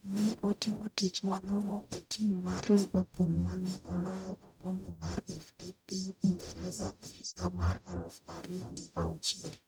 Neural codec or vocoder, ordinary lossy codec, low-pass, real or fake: codec, 44.1 kHz, 0.9 kbps, DAC; none; none; fake